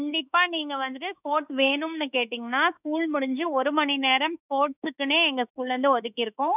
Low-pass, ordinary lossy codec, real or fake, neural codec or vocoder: 3.6 kHz; none; fake; autoencoder, 48 kHz, 32 numbers a frame, DAC-VAE, trained on Japanese speech